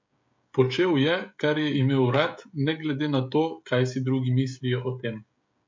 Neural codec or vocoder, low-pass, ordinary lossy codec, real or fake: codec, 16 kHz, 16 kbps, FreqCodec, smaller model; 7.2 kHz; MP3, 48 kbps; fake